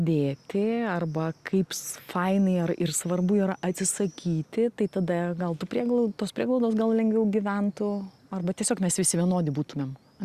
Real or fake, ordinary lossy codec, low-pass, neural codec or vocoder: real; Opus, 64 kbps; 14.4 kHz; none